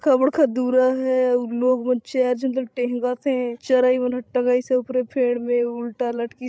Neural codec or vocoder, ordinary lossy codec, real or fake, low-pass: codec, 16 kHz, 16 kbps, FreqCodec, larger model; none; fake; none